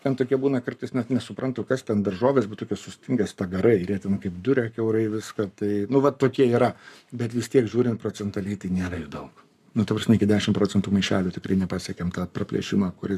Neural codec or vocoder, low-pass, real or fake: codec, 44.1 kHz, 7.8 kbps, Pupu-Codec; 14.4 kHz; fake